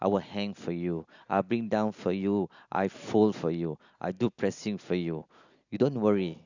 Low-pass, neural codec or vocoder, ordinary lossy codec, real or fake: 7.2 kHz; none; none; real